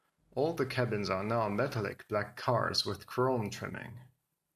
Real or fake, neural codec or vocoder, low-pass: real; none; 14.4 kHz